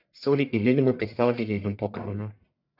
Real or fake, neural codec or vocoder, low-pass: fake; codec, 44.1 kHz, 1.7 kbps, Pupu-Codec; 5.4 kHz